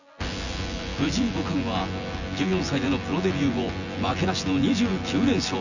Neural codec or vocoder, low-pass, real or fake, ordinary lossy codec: vocoder, 24 kHz, 100 mel bands, Vocos; 7.2 kHz; fake; none